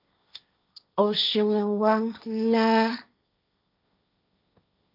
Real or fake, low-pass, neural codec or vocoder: fake; 5.4 kHz; codec, 16 kHz, 1.1 kbps, Voila-Tokenizer